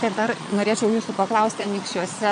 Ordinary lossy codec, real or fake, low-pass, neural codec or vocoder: AAC, 64 kbps; fake; 9.9 kHz; vocoder, 22.05 kHz, 80 mel bands, Vocos